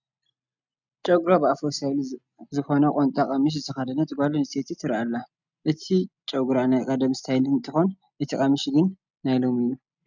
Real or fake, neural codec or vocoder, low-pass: real; none; 7.2 kHz